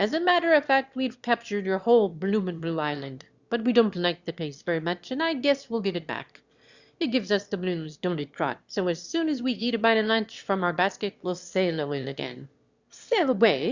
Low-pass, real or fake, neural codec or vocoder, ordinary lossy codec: 7.2 kHz; fake; autoencoder, 22.05 kHz, a latent of 192 numbers a frame, VITS, trained on one speaker; Opus, 64 kbps